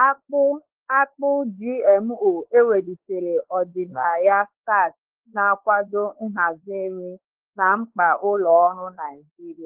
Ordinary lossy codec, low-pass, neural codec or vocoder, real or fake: Opus, 16 kbps; 3.6 kHz; codec, 16 kHz, 4 kbps, X-Codec, WavLM features, trained on Multilingual LibriSpeech; fake